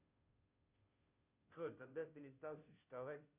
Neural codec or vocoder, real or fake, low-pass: codec, 16 kHz, 0.5 kbps, FunCodec, trained on Chinese and English, 25 frames a second; fake; 3.6 kHz